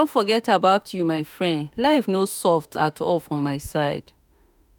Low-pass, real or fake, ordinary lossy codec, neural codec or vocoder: none; fake; none; autoencoder, 48 kHz, 32 numbers a frame, DAC-VAE, trained on Japanese speech